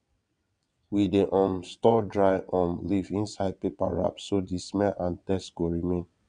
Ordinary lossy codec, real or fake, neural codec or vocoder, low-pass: none; fake; vocoder, 22.05 kHz, 80 mel bands, WaveNeXt; 9.9 kHz